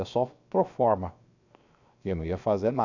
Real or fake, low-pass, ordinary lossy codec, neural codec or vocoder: fake; 7.2 kHz; none; codec, 16 kHz, 0.7 kbps, FocalCodec